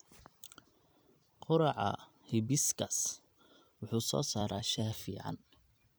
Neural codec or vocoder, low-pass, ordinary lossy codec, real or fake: vocoder, 44.1 kHz, 128 mel bands every 256 samples, BigVGAN v2; none; none; fake